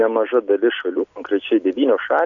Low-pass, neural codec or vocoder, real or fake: 7.2 kHz; none; real